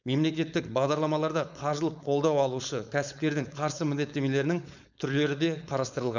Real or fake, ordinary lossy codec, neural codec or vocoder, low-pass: fake; none; codec, 16 kHz, 4.8 kbps, FACodec; 7.2 kHz